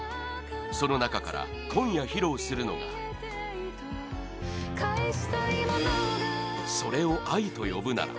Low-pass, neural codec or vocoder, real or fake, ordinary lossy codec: none; none; real; none